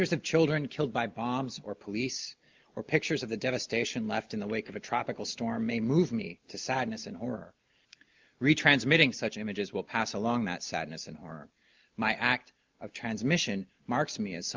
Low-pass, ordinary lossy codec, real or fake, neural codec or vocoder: 7.2 kHz; Opus, 24 kbps; real; none